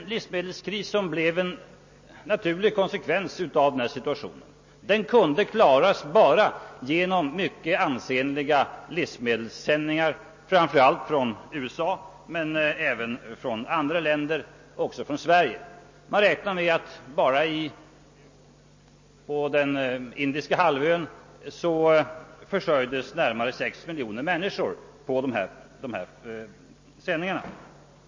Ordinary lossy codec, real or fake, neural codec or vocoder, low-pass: MP3, 32 kbps; real; none; 7.2 kHz